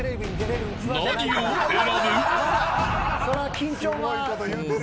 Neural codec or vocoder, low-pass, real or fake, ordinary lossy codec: none; none; real; none